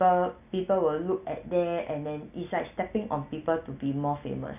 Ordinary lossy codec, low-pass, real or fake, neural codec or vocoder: none; 3.6 kHz; real; none